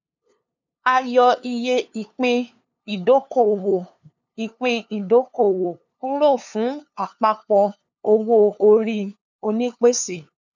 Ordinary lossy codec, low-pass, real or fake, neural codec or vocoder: none; 7.2 kHz; fake; codec, 16 kHz, 2 kbps, FunCodec, trained on LibriTTS, 25 frames a second